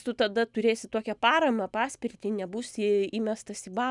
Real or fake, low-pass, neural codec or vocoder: real; 10.8 kHz; none